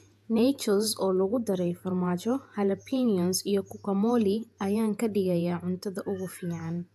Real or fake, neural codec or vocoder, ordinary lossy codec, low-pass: fake; vocoder, 48 kHz, 128 mel bands, Vocos; AAC, 96 kbps; 14.4 kHz